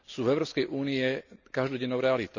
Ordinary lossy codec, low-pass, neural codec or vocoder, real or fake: none; 7.2 kHz; none; real